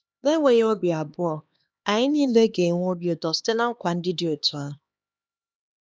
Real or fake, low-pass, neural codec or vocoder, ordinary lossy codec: fake; none; codec, 16 kHz, 2 kbps, X-Codec, HuBERT features, trained on LibriSpeech; none